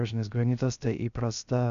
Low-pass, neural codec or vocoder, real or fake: 7.2 kHz; codec, 16 kHz, 0.3 kbps, FocalCodec; fake